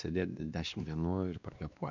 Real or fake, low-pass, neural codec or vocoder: fake; 7.2 kHz; codec, 16 kHz, 2 kbps, X-Codec, WavLM features, trained on Multilingual LibriSpeech